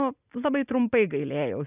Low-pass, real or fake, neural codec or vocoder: 3.6 kHz; real; none